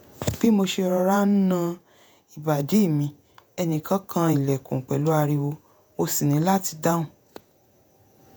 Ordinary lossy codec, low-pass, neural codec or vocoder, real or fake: none; none; vocoder, 48 kHz, 128 mel bands, Vocos; fake